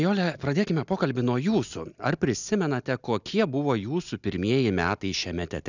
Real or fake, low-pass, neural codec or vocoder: real; 7.2 kHz; none